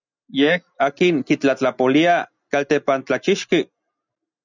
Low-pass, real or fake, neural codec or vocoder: 7.2 kHz; real; none